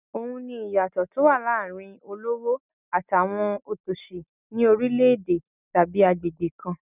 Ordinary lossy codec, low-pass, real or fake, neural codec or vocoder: none; 3.6 kHz; real; none